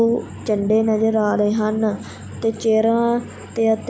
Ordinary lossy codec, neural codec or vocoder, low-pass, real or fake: none; none; none; real